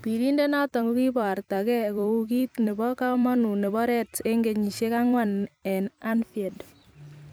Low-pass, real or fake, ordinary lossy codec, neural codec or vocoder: none; fake; none; vocoder, 44.1 kHz, 128 mel bands every 256 samples, BigVGAN v2